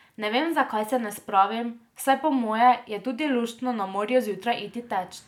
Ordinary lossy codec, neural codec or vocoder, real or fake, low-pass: none; none; real; 19.8 kHz